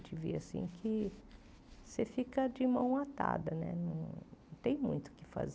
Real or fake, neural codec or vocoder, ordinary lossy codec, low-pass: real; none; none; none